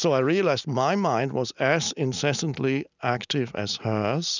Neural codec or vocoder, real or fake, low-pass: none; real; 7.2 kHz